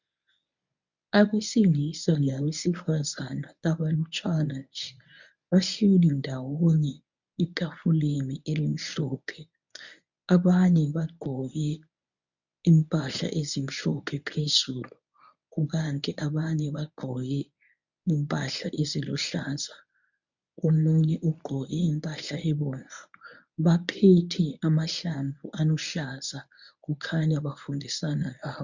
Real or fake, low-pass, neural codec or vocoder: fake; 7.2 kHz; codec, 24 kHz, 0.9 kbps, WavTokenizer, medium speech release version 1